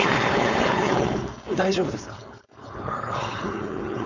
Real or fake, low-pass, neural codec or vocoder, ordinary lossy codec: fake; 7.2 kHz; codec, 16 kHz, 4.8 kbps, FACodec; none